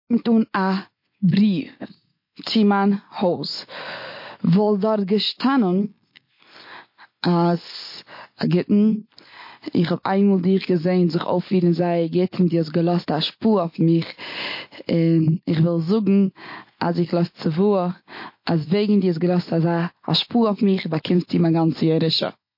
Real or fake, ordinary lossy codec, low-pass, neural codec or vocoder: real; MP3, 32 kbps; 5.4 kHz; none